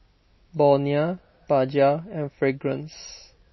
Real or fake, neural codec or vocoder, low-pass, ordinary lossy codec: real; none; 7.2 kHz; MP3, 24 kbps